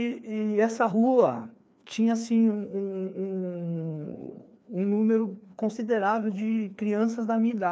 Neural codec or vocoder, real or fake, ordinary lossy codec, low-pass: codec, 16 kHz, 2 kbps, FreqCodec, larger model; fake; none; none